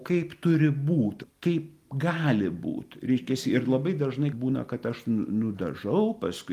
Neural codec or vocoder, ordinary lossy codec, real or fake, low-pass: none; Opus, 32 kbps; real; 14.4 kHz